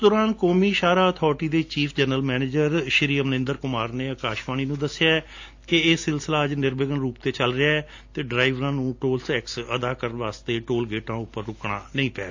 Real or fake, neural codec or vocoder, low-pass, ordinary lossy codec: real; none; 7.2 kHz; AAC, 48 kbps